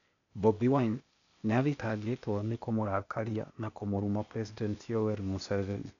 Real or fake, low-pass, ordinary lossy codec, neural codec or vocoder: fake; 7.2 kHz; none; codec, 16 kHz, 0.8 kbps, ZipCodec